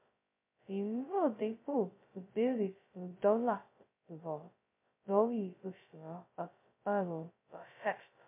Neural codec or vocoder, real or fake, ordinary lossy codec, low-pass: codec, 16 kHz, 0.2 kbps, FocalCodec; fake; MP3, 24 kbps; 3.6 kHz